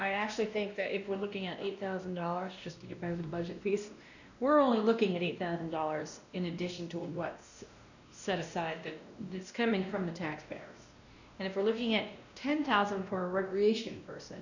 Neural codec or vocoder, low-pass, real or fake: codec, 16 kHz, 1 kbps, X-Codec, WavLM features, trained on Multilingual LibriSpeech; 7.2 kHz; fake